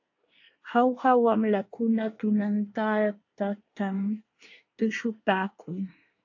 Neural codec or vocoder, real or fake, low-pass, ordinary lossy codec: codec, 24 kHz, 1 kbps, SNAC; fake; 7.2 kHz; AAC, 48 kbps